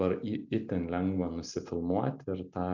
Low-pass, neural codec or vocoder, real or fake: 7.2 kHz; none; real